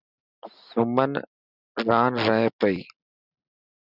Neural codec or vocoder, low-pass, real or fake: none; 5.4 kHz; real